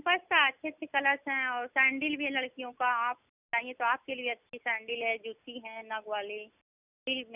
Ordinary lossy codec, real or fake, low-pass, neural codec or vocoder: none; real; 3.6 kHz; none